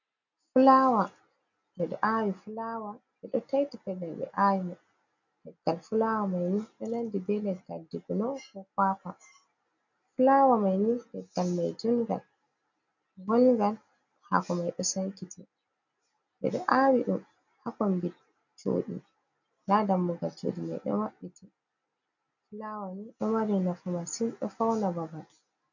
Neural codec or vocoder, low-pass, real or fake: none; 7.2 kHz; real